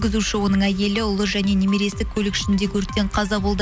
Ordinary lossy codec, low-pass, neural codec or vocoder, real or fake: none; none; none; real